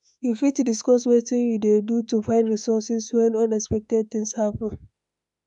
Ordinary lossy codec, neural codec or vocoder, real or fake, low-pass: none; autoencoder, 48 kHz, 32 numbers a frame, DAC-VAE, trained on Japanese speech; fake; 10.8 kHz